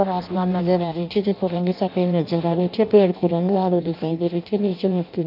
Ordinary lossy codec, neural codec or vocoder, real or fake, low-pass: none; codec, 16 kHz in and 24 kHz out, 0.6 kbps, FireRedTTS-2 codec; fake; 5.4 kHz